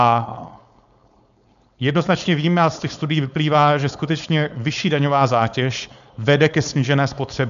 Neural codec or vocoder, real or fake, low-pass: codec, 16 kHz, 4.8 kbps, FACodec; fake; 7.2 kHz